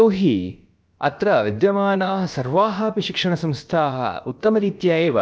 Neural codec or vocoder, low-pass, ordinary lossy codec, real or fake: codec, 16 kHz, about 1 kbps, DyCAST, with the encoder's durations; none; none; fake